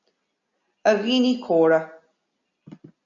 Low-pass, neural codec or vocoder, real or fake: 7.2 kHz; none; real